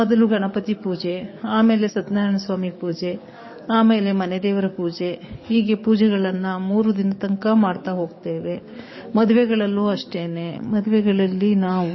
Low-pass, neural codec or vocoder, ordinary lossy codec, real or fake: 7.2 kHz; codec, 44.1 kHz, 7.8 kbps, DAC; MP3, 24 kbps; fake